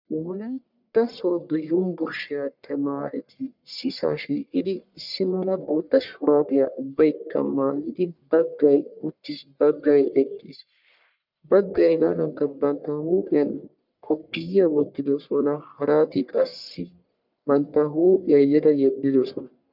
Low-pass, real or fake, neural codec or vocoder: 5.4 kHz; fake; codec, 44.1 kHz, 1.7 kbps, Pupu-Codec